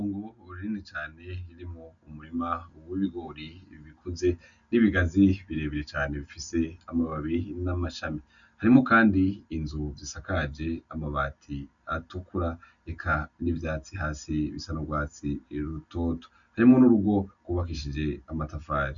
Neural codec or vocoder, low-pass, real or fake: none; 7.2 kHz; real